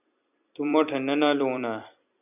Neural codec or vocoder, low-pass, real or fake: vocoder, 44.1 kHz, 128 mel bands every 256 samples, BigVGAN v2; 3.6 kHz; fake